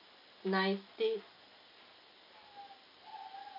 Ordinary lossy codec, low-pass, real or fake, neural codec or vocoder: none; 5.4 kHz; real; none